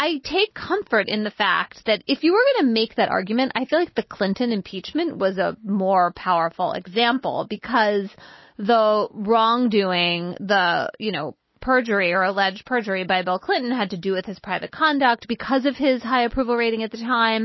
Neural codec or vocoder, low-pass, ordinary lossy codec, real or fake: none; 7.2 kHz; MP3, 24 kbps; real